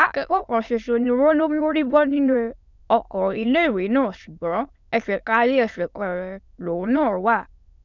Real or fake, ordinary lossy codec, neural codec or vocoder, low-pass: fake; none; autoencoder, 22.05 kHz, a latent of 192 numbers a frame, VITS, trained on many speakers; 7.2 kHz